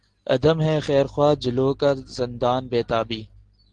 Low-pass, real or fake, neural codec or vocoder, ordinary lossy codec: 9.9 kHz; real; none; Opus, 16 kbps